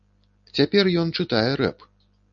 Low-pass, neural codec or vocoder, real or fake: 7.2 kHz; none; real